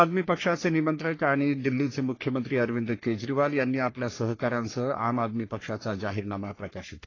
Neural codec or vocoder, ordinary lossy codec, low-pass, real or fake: codec, 44.1 kHz, 3.4 kbps, Pupu-Codec; AAC, 32 kbps; 7.2 kHz; fake